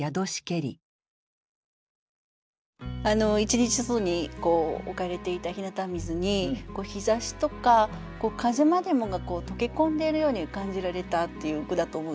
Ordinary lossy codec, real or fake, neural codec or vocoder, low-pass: none; real; none; none